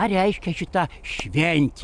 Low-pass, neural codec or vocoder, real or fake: 9.9 kHz; none; real